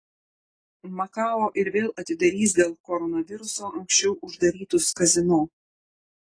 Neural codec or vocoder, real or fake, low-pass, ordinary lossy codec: none; real; 9.9 kHz; AAC, 32 kbps